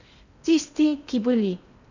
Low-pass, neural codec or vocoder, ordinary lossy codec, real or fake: 7.2 kHz; codec, 16 kHz in and 24 kHz out, 0.6 kbps, FocalCodec, streaming, 2048 codes; none; fake